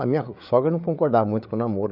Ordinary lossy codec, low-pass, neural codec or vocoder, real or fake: none; 5.4 kHz; codec, 16 kHz, 8 kbps, FreqCodec, larger model; fake